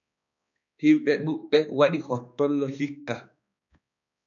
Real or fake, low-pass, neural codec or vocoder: fake; 7.2 kHz; codec, 16 kHz, 2 kbps, X-Codec, HuBERT features, trained on balanced general audio